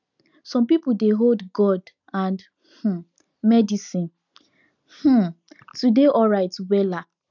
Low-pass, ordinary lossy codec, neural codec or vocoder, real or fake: 7.2 kHz; none; none; real